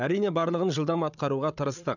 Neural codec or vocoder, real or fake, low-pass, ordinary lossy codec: codec, 16 kHz, 8 kbps, FreqCodec, larger model; fake; 7.2 kHz; none